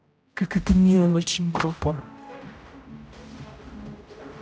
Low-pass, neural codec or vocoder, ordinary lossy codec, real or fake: none; codec, 16 kHz, 0.5 kbps, X-Codec, HuBERT features, trained on general audio; none; fake